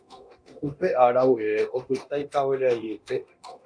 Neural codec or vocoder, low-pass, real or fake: codec, 24 kHz, 0.9 kbps, DualCodec; 9.9 kHz; fake